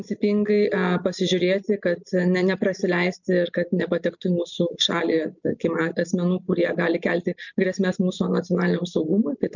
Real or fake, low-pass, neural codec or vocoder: real; 7.2 kHz; none